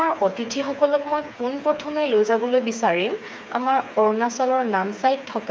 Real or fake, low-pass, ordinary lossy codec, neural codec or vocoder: fake; none; none; codec, 16 kHz, 4 kbps, FreqCodec, smaller model